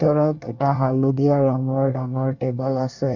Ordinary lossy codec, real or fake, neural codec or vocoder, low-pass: none; fake; codec, 24 kHz, 1 kbps, SNAC; 7.2 kHz